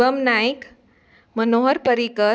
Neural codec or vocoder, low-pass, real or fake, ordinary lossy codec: none; none; real; none